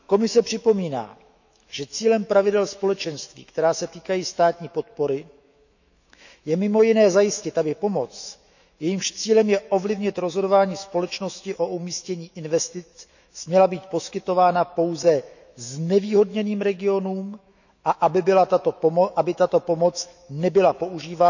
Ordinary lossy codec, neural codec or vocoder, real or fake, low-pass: none; autoencoder, 48 kHz, 128 numbers a frame, DAC-VAE, trained on Japanese speech; fake; 7.2 kHz